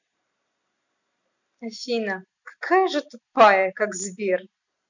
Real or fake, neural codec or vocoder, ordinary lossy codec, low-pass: real; none; none; 7.2 kHz